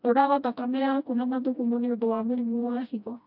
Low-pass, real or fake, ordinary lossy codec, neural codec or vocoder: 5.4 kHz; fake; none; codec, 16 kHz, 1 kbps, FreqCodec, smaller model